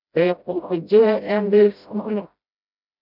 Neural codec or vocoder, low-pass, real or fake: codec, 16 kHz, 0.5 kbps, FreqCodec, smaller model; 5.4 kHz; fake